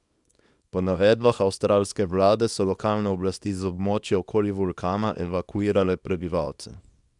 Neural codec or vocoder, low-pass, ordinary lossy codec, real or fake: codec, 24 kHz, 0.9 kbps, WavTokenizer, small release; 10.8 kHz; none; fake